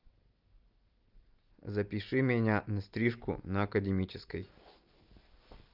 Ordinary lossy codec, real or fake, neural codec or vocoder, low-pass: Opus, 24 kbps; real; none; 5.4 kHz